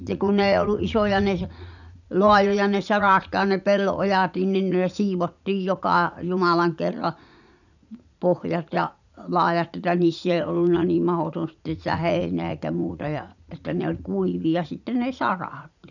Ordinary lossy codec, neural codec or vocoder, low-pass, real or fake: none; vocoder, 44.1 kHz, 80 mel bands, Vocos; 7.2 kHz; fake